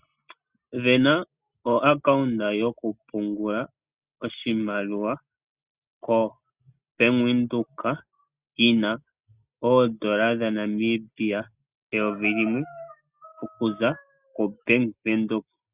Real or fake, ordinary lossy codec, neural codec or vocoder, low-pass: real; Opus, 64 kbps; none; 3.6 kHz